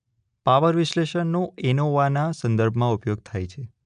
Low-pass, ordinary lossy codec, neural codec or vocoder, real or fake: 9.9 kHz; none; none; real